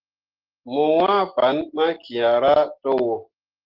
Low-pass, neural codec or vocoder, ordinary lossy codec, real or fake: 5.4 kHz; none; Opus, 16 kbps; real